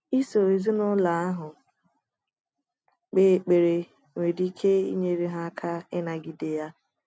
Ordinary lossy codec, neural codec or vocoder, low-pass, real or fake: none; none; none; real